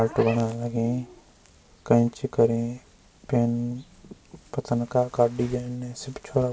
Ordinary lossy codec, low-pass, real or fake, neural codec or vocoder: none; none; real; none